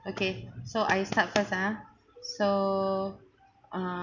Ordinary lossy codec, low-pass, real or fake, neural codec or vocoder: none; 7.2 kHz; real; none